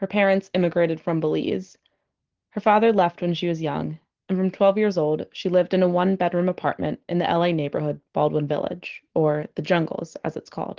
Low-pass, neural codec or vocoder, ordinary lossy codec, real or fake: 7.2 kHz; none; Opus, 16 kbps; real